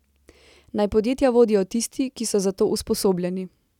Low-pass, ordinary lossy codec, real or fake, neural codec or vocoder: 19.8 kHz; none; real; none